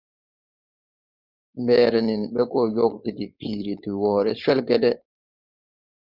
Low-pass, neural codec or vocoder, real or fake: 5.4 kHz; codec, 16 kHz, 4.8 kbps, FACodec; fake